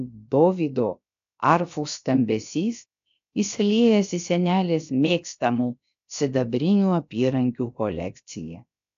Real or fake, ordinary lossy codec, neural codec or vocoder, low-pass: fake; AAC, 64 kbps; codec, 16 kHz, about 1 kbps, DyCAST, with the encoder's durations; 7.2 kHz